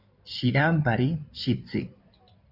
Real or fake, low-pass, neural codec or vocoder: fake; 5.4 kHz; codec, 16 kHz in and 24 kHz out, 2.2 kbps, FireRedTTS-2 codec